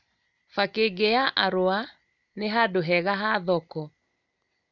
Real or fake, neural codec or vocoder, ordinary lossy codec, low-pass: real; none; none; 7.2 kHz